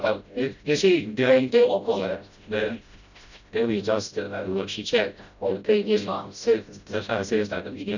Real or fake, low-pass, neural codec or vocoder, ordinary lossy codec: fake; 7.2 kHz; codec, 16 kHz, 0.5 kbps, FreqCodec, smaller model; none